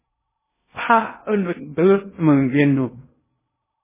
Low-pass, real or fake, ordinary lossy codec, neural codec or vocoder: 3.6 kHz; fake; MP3, 16 kbps; codec, 16 kHz in and 24 kHz out, 0.6 kbps, FocalCodec, streaming, 2048 codes